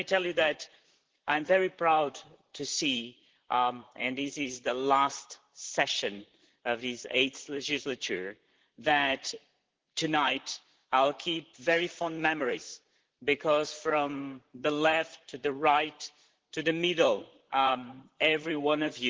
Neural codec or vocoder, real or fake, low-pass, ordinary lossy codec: vocoder, 44.1 kHz, 128 mel bands, Pupu-Vocoder; fake; 7.2 kHz; Opus, 16 kbps